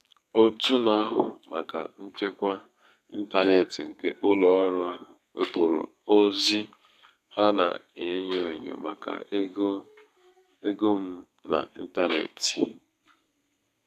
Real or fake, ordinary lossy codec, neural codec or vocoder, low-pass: fake; none; codec, 32 kHz, 1.9 kbps, SNAC; 14.4 kHz